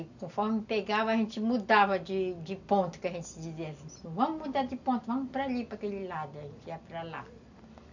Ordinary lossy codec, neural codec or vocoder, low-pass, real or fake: none; none; 7.2 kHz; real